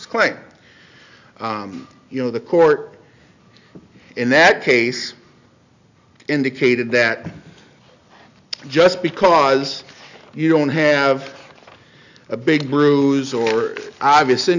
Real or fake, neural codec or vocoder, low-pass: real; none; 7.2 kHz